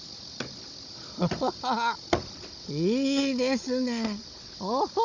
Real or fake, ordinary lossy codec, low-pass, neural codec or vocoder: fake; none; 7.2 kHz; codec, 16 kHz, 16 kbps, FunCodec, trained on Chinese and English, 50 frames a second